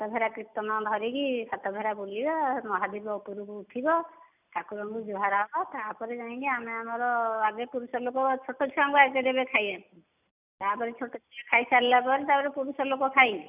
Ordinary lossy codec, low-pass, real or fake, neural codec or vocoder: none; 3.6 kHz; real; none